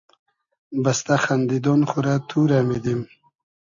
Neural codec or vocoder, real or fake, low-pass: none; real; 7.2 kHz